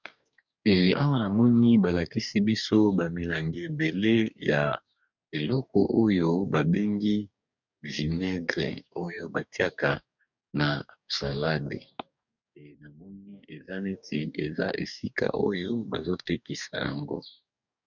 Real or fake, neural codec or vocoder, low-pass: fake; codec, 44.1 kHz, 2.6 kbps, DAC; 7.2 kHz